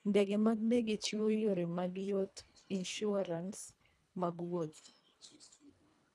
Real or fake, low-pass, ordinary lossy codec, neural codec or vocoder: fake; none; none; codec, 24 kHz, 1.5 kbps, HILCodec